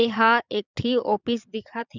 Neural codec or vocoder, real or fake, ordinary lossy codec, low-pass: vocoder, 22.05 kHz, 80 mel bands, Vocos; fake; none; 7.2 kHz